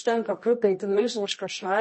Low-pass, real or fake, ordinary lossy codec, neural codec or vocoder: 10.8 kHz; fake; MP3, 32 kbps; codec, 24 kHz, 0.9 kbps, WavTokenizer, medium music audio release